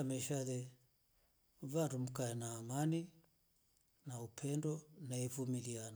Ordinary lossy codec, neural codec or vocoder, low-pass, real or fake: none; none; none; real